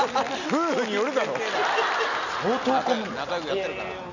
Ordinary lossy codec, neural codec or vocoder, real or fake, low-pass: none; none; real; 7.2 kHz